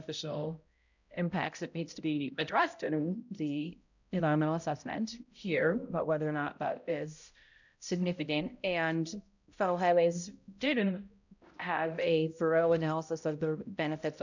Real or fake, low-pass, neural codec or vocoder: fake; 7.2 kHz; codec, 16 kHz, 0.5 kbps, X-Codec, HuBERT features, trained on balanced general audio